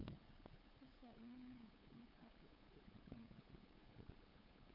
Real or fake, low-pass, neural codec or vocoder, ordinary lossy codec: fake; 5.4 kHz; codec, 16 kHz, 8 kbps, FunCodec, trained on LibriTTS, 25 frames a second; none